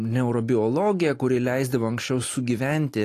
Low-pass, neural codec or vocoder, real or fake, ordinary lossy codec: 14.4 kHz; none; real; AAC, 48 kbps